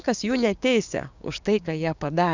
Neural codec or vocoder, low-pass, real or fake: codec, 16 kHz, 6 kbps, DAC; 7.2 kHz; fake